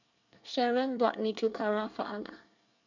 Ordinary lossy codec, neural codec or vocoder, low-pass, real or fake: none; codec, 24 kHz, 1 kbps, SNAC; 7.2 kHz; fake